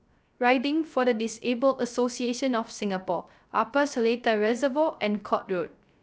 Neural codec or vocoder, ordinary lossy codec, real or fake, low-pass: codec, 16 kHz, 0.3 kbps, FocalCodec; none; fake; none